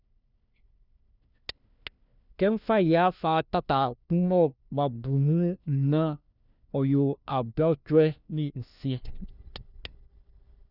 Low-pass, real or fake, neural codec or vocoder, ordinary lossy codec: 5.4 kHz; fake; codec, 16 kHz, 1 kbps, FunCodec, trained on LibriTTS, 50 frames a second; none